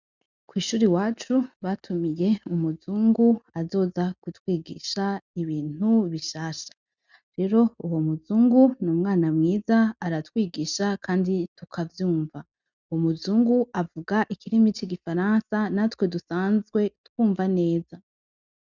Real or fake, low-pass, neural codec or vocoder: real; 7.2 kHz; none